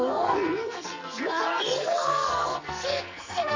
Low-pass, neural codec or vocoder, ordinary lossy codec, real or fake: 7.2 kHz; codec, 44.1 kHz, 2.6 kbps, SNAC; MP3, 64 kbps; fake